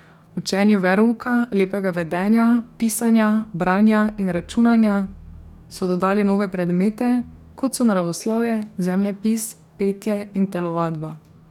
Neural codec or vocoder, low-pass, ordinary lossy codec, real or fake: codec, 44.1 kHz, 2.6 kbps, DAC; 19.8 kHz; none; fake